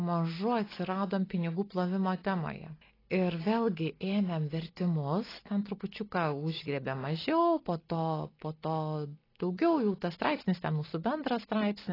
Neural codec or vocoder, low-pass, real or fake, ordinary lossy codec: none; 5.4 kHz; real; AAC, 24 kbps